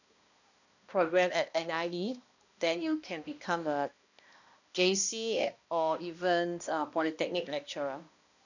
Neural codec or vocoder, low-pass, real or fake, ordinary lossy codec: codec, 16 kHz, 1 kbps, X-Codec, HuBERT features, trained on balanced general audio; 7.2 kHz; fake; none